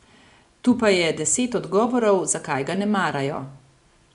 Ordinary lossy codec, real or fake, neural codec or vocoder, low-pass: none; fake; vocoder, 24 kHz, 100 mel bands, Vocos; 10.8 kHz